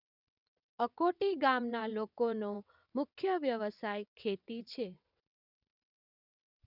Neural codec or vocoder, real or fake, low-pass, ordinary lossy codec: vocoder, 22.05 kHz, 80 mel bands, WaveNeXt; fake; 5.4 kHz; none